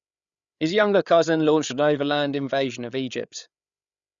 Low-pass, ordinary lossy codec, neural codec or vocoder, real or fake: 7.2 kHz; Opus, 64 kbps; codec, 16 kHz, 8 kbps, FreqCodec, larger model; fake